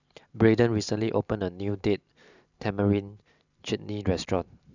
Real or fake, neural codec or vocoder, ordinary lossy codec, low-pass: fake; vocoder, 44.1 kHz, 128 mel bands every 512 samples, BigVGAN v2; none; 7.2 kHz